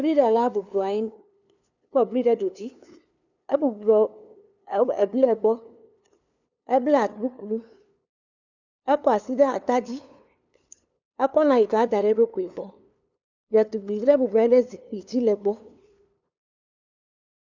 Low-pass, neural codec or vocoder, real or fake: 7.2 kHz; codec, 16 kHz, 2 kbps, FunCodec, trained on LibriTTS, 25 frames a second; fake